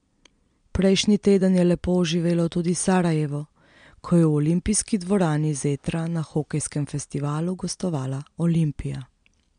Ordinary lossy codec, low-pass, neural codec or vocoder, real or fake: MP3, 64 kbps; 9.9 kHz; none; real